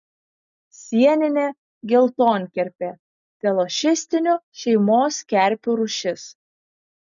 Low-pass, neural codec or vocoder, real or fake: 7.2 kHz; none; real